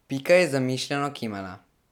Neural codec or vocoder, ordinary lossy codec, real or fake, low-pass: none; none; real; 19.8 kHz